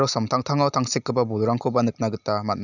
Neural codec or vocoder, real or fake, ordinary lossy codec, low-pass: none; real; none; 7.2 kHz